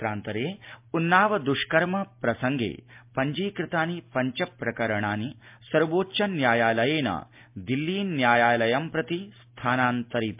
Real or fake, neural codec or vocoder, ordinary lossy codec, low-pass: real; none; MP3, 32 kbps; 3.6 kHz